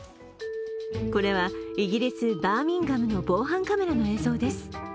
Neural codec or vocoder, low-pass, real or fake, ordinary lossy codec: none; none; real; none